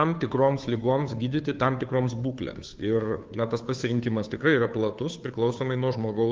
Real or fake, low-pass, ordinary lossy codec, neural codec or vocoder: fake; 7.2 kHz; Opus, 24 kbps; codec, 16 kHz, 2 kbps, FunCodec, trained on Chinese and English, 25 frames a second